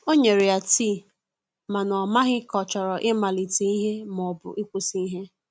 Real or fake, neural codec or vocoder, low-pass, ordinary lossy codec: real; none; none; none